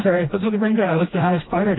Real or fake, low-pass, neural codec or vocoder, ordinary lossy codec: fake; 7.2 kHz; codec, 16 kHz, 1 kbps, FreqCodec, smaller model; AAC, 16 kbps